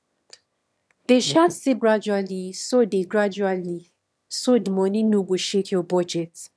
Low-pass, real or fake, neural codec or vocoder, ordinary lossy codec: none; fake; autoencoder, 22.05 kHz, a latent of 192 numbers a frame, VITS, trained on one speaker; none